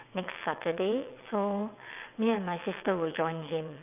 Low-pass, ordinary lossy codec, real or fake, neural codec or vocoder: 3.6 kHz; none; fake; vocoder, 22.05 kHz, 80 mel bands, WaveNeXt